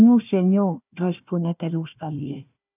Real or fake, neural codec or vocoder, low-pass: fake; codec, 16 kHz, 1 kbps, FunCodec, trained on Chinese and English, 50 frames a second; 3.6 kHz